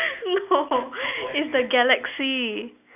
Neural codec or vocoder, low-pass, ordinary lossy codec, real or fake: none; 3.6 kHz; none; real